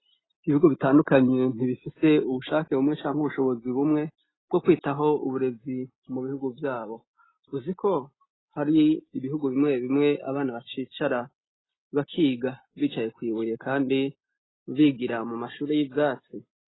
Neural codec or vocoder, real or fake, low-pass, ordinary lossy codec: none; real; 7.2 kHz; AAC, 16 kbps